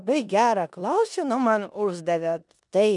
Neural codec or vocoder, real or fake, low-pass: codec, 16 kHz in and 24 kHz out, 0.9 kbps, LongCat-Audio-Codec, four codebook decoder; fake; 10.8 kHz